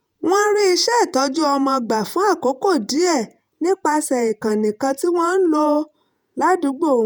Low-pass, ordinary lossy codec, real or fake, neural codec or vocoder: none; none; fake; vocoder, 48 kHz, 128 mel bands, Vocos